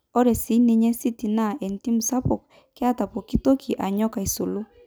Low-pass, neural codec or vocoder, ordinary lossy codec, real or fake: none; none; none; real